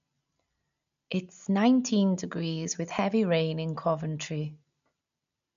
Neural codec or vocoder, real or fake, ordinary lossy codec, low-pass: none; real; MP3, 96 kbps; 7.2 kHz